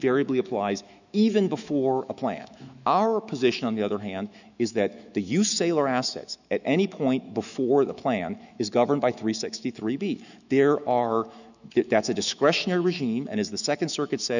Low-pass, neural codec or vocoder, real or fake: 7.2 kHz; autoencoder, 48 kHz, 128 numbers a frame, DAC-VAE, trained on Japanese speech; fake